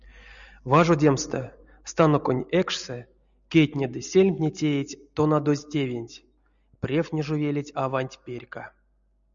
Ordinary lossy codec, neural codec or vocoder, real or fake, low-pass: MP3, 96 kbps; none; real; 7.2 kHz